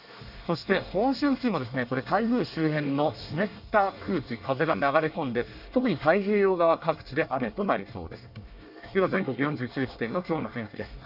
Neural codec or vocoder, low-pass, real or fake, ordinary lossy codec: codec, 24 kHz, 1 kbps, SNAC; 5.4 kHz; fake; none